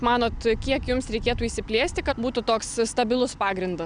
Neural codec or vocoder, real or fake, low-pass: none; real; 9.9 kHz